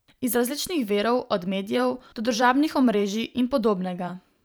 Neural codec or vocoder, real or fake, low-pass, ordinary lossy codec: vocoder, 44.1 kHz, 128 mel bands every 512 samples, BigVGAN v2; fake; none; none